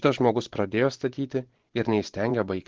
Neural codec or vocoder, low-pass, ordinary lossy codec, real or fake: vocoder, 24 kHz, 100 mel bands, Vocos; 7.2 kHz; Opus, 16 kbps; fake